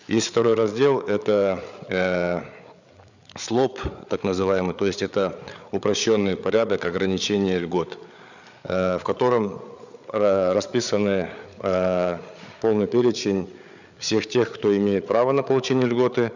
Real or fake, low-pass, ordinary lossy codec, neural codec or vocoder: fake; 7.2 kHz; none; codec, 16 kHz, 8 kbps, FreqCodec, larger model